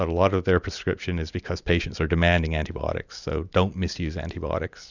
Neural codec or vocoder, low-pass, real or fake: none; 7.2 kHz; real